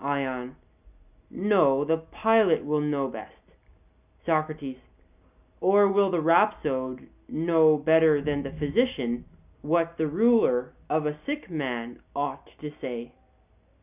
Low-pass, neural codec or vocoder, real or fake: 3.6 kHz; none; real